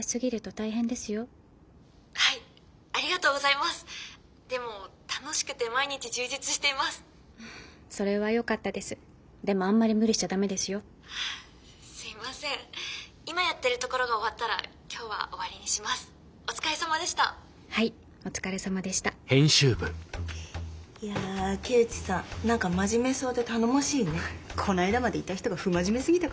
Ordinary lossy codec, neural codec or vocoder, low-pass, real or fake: none; none; none; real